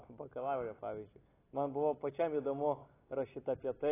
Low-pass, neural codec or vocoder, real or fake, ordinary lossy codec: 3.6 kHz; none; real; AAC, 16 kbps